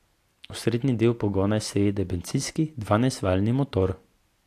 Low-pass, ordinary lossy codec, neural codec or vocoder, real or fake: 14.4 kHz; AAC, 64 kbps; none; real